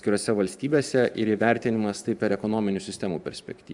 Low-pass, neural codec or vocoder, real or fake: 10.8 kHz; vocoder, 44.1 kHz, 128 mel bands every 512 samples, BigVGAN v2; fake